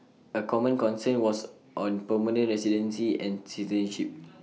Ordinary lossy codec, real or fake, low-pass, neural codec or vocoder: none; real; none; none